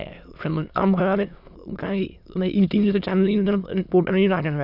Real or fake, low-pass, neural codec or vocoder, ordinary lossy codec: fake; 5.4 kHz; autoencoder, 22.05 kHz, a latent of 192 numbers a frame, VITS, trained on many speakers; none